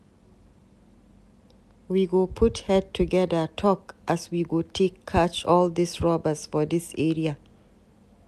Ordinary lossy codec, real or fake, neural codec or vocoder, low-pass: none; real; none; 14.4 kHz